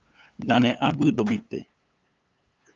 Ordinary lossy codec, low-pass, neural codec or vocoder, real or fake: Opus, 24 kbps; 7.2 kHz; codec, 16 kHz, 8 kbps, FunCodec, trained on LibriTTS, 25 frames a second; fake